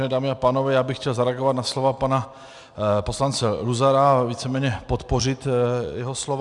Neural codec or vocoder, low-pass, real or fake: none; 10.8 kHz; real